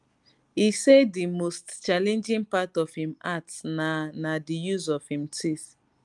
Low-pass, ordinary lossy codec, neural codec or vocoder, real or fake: 10.8 kHz; Opus, 32 kbps; none; real